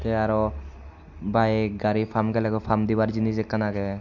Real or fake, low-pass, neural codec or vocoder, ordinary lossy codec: real; 7.2 kHz; none; none